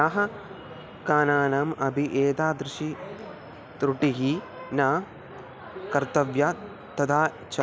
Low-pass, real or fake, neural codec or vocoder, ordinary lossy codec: none; real; none; none